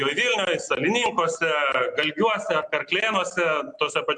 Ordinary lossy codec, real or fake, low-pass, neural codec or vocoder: MP3, 64 kbps; real; 10.8 kHz; none